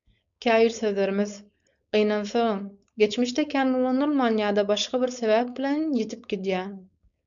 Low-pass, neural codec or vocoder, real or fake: 7.2 kHz; codec, 16 kHz, 4.8 kbps, FACodec; fake